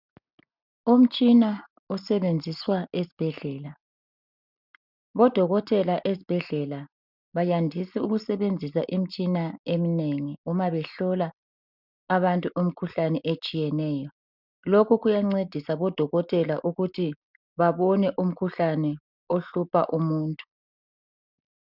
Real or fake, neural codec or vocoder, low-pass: real; none; 5.4 kHz